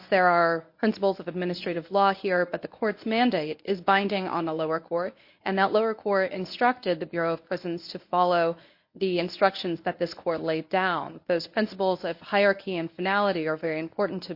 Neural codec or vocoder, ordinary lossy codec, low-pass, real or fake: codec, 24 kHz, 0.9 kbps, WavTokenizer, medium speech release version 1; MP3, 32 kbps; 5.4 kHz; fake